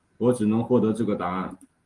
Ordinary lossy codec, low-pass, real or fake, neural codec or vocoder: Opus, 24 kbps; 10.8 kHz; real; none